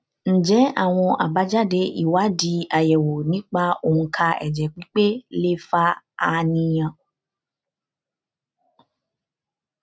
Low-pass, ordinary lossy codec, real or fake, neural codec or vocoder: none; none; real; none